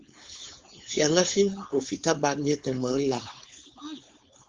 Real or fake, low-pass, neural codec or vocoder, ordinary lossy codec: fake; 7.2 kHz; codec, 16 kHz, 4.8 kbps, FACodec; Opus, 32 kbps